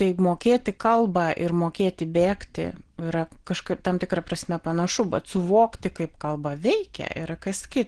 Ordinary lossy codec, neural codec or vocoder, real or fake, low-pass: Opus, 16 kbps; none; real; 9.9 kHz